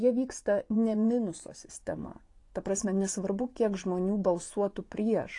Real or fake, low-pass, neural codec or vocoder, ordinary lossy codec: fake; 10.8 kHz; vocoder, 24 kHz, 100 mel bands, Vocos; AAC, 48 kbps